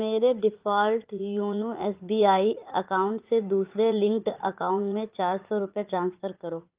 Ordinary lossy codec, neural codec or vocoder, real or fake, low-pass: Opus, 32 kbps; vocoder, 44.1 kHz, 80 mel bands, Vocos; fake; 3.6 kHz